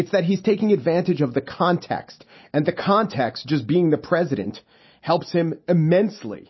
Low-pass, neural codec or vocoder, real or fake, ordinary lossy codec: 7.2 kHz; none; real; MP3, 24 kbps